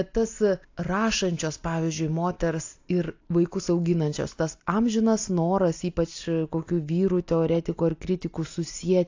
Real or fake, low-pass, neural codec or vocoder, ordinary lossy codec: real; 7.2 kHz; none; AAC, 48 kbps